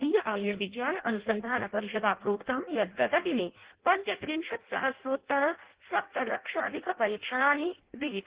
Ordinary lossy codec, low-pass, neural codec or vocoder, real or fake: Opus, 16 kbps; 3.6 kHz; codec, 16 kHz in and 24 kHz out, 0.6 kbps, FireRedTTS-2 codec; fake